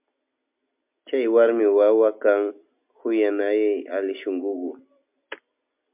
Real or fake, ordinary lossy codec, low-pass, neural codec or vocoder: real; MP3, 32 kbps; 3.6 kHz; none